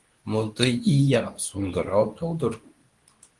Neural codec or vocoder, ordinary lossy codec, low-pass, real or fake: codec, 24 kHz, 0.9 kbps, WavTokenizer, medium speech release version 2; Opus, 24 kbps; 10.8 kHz; fake